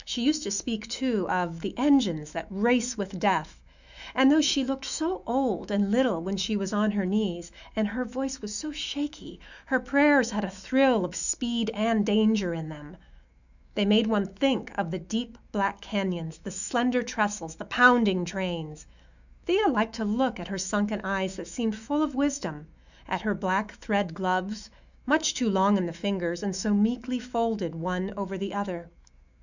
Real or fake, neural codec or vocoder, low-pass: fake; autoencoder, 48 kHz, 128 numbers a frame, DAC-VAE, trained on Japanese speech; 7.2 kHz